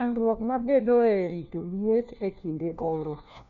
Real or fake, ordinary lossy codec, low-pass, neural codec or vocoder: fake; none; 7.2 kHz; codec, 16 kHz, 1 kbps, FunCodec, trained on LibriTTS, 50 frames a second